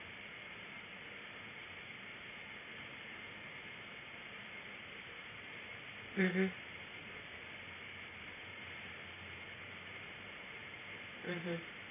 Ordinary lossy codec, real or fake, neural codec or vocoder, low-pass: AAC, 16 kbps; fake; vocoder, 44.1 kHz, 128 mel bands every 512 samples, BigVGAN v2; 3.6 kHz